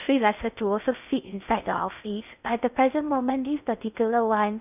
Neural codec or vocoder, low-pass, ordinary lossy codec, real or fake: codec, 16 kHz in and 24 kHz out, 0.6 kbps, FocalCodec, streaming, 2048 codes; 3.6 kHz; none; fake